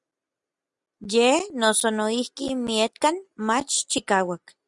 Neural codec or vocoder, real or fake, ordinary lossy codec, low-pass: none; real; Opus, 64 kbps; 10.8 kHz